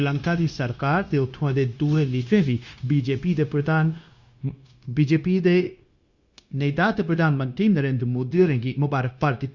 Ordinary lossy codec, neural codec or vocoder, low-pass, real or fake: Opus, 64 kbps; codec, 16 kHz, 0.9 kbps, LongCat-Audio-Codec; 7.2 kHz; fake